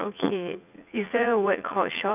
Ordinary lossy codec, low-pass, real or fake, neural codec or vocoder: none; 3.6 kHz; fake; vocoder, 44.1 kHz, 80 mel bands, Vocos